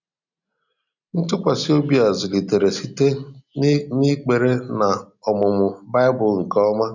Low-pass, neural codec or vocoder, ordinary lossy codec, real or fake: 7.2 kHz; none; none; real